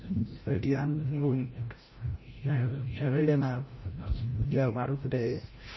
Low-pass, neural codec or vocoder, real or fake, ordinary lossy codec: 7.2 kHz; codec, 16 kHz, 0.5 kbps, FreqCodec, larger model; fake; MP3, 24 kbps